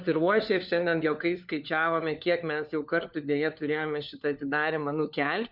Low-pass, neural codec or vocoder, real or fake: 5.4 kHz; codec, 16 kHz, 4 kbps, FunCodec, trained on LibriTTS, 50 frames a second; fake